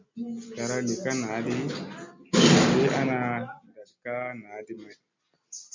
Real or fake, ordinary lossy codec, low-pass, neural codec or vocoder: real; MP3, 64 kbps; 7.2 kHz; none